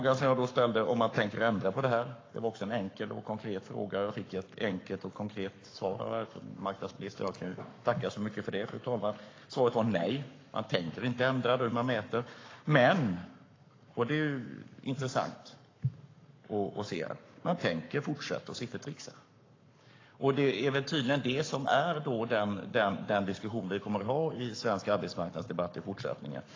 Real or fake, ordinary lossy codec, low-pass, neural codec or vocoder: fake; AAC, 32 kbps; 7.2 kHz; codec, 44.1 kHz, 7.8 kbps, Pupu-Codec